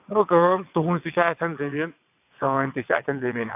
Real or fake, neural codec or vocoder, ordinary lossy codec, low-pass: fake; vocoder, 22.05 kHz, 80 mel bands, WaveNeXt; AAC, 32 kbps; 3.6 kHz